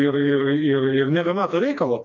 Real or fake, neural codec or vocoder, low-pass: fake; codec, 16 kHz, 2 kbps, FreqCodec, smaller model; 7.2 kHz